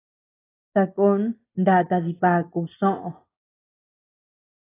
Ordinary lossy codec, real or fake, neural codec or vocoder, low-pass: AAC, 16 kbps; real; none; 3.6 kHz